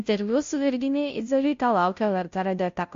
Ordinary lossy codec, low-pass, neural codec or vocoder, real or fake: MP3, 48 kbps; 7.2 kHz; codec, 16 kHz, 0.5 kbps, FunCodec, trained on Chinese and English, 25 frames a second; fake